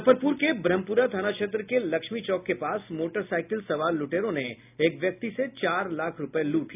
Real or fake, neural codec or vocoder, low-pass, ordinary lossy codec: real; none; 3.6 kHz; none